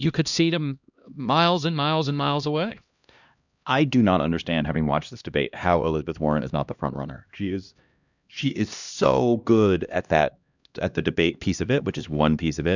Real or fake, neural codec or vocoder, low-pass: fake; codec, 16 kHz, 1 kbps, X-Codec, HuBERT features, trained on LibriSpeech; 7.2 kHz